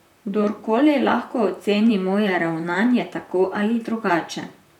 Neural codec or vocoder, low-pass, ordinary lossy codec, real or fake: vocoder, 44.1 kHz, 128 mel bands, Pupu-Vocoder; 19.8 kHz; none; fake